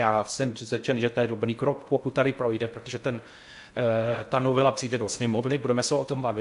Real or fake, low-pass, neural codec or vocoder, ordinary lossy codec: fake; 10.8 kHz; codec, 16 kHz in and 24 kHz out, 0.6 kbps, FocalCodec, streaming, 2048 codes; AAC, 64 kbps